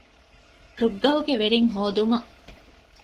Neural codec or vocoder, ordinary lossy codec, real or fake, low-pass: codec, 44.1 kHz, 7.8 kbps, Pupu-Codec; Opus, 16 kbps; fake; 14.4 kHz